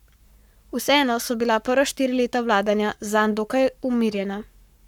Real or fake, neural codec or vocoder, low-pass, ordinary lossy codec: fake; codec, 44.1 kHz, 7.8 kbps, Pupu-Codec; 19.8 kHz; none